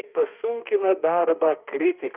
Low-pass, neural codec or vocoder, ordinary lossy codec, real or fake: 3.6 kHz; autoencoder, 48 kHz, 32 numbers a frame, DAC-VAE, trained on Japanese speech; Opus, 24 kbps; fake